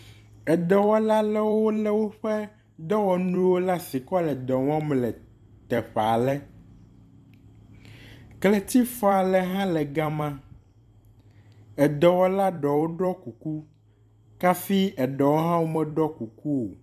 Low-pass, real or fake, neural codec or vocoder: 14.4 kHz; fake; vocoder, 44.1 kHz, 128 mel bands every 256 samples, BigVGAN v2